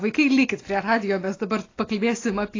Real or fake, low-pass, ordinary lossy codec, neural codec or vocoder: real; 7.2 kHz; AAC, 32 kbps; none